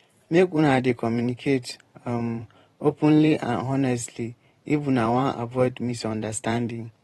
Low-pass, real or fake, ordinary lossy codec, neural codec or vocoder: 19.8 kHz; fake; AAC, 32 kbps; vocoder, 44.1 kHz, 128 mel bands every 512 samples, BigVGAN v2